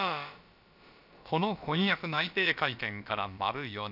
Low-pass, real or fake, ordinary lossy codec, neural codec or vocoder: 5.4 kHz; fake; none; codec, 16 kHz, about 1 kbps, DyCAST, with the encoder's durations